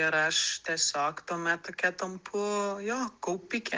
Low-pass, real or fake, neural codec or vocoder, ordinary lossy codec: 7.2 kHz; real; none; Opus, 16 kbps